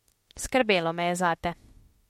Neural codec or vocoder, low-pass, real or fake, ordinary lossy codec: autoencoder, 48 kHz, 32 numbers a frame, DAC-VAE, trained on Japanese speech; 19.8 kHz; fake; MP3, 64 kbps